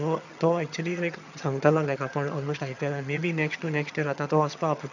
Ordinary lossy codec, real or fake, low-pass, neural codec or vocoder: none; fake; 7.2 kHz; vocoder, 22.05 kHz, 80 mel bands, HiFi-GAN